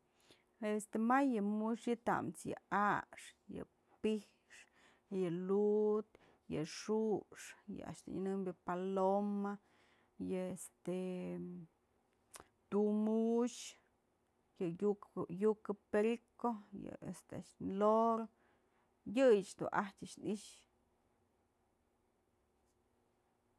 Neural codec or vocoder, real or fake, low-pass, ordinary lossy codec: none; real; none; none